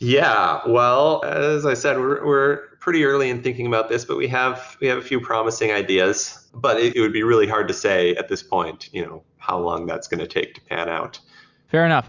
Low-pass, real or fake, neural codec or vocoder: 7.2 kHz; real; none